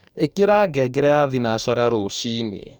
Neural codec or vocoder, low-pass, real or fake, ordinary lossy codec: codec, 44.1 kHz, 2.6 kbps, DAC; 19.8 kHz; fake; none